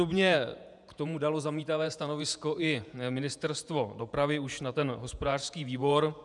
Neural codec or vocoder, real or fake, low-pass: vocoder, 48 kHz, 128 mel bands, Vocos; fake; 10.8 kHz